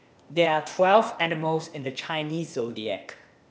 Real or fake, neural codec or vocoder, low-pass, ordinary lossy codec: fake; codec, 16 kHz, 0.8 kbps, ZipCodec; none; none